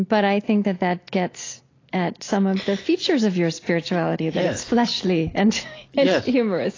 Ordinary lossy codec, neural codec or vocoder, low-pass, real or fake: AAC, 32 kbps; none; 7.2 kHz; real